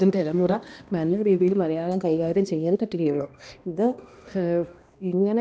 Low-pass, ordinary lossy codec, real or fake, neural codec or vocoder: none; none; fake; codec, 16 kHz, 1 kbps, X-Codec, HuBERT features, trained on balanced general audio